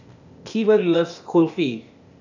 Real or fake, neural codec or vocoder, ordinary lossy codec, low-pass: fake; codec, 16 kHz, 0.8 kbps, ZipCodec; none; 7.2 kHz